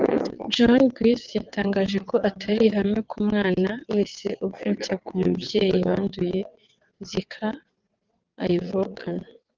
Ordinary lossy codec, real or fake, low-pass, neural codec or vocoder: Opus, 32 kbps; fake; 7.2 kHz; vocoder, 22.05 kHz, 80 mel bands, WaveNeXt